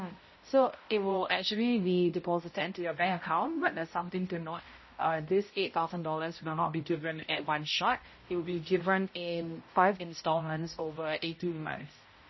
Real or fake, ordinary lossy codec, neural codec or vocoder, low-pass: fake; MP3, 24 kbps; codec, 16 kHz, 0.5 kbps, X-Codec, HuBERT features, trained on balanced general audio; 7.2 kHz